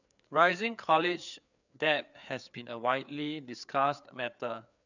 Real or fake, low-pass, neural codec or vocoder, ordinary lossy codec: fake; 7.2 kHz; codec, 16 kHz, 4 kbps, FreqCodec, larger model; none